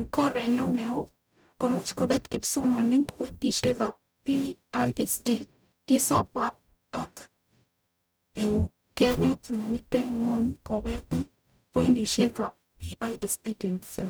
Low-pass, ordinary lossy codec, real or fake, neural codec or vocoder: none; none; fake; codec, 44.1 kHz, 0.9 kbps, DAC